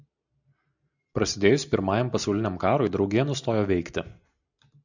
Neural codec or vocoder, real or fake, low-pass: none; real; 7.2 kHz